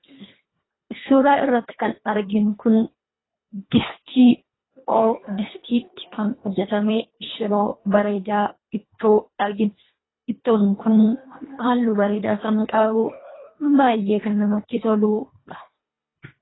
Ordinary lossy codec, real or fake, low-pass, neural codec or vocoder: AAC, 16 kbps; fake; 7.2 kHz; codec, 24 kHz, 1.5 kbps, HILCodec